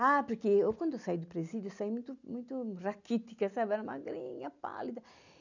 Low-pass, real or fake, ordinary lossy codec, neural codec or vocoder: 7.2 kHz; real; none; none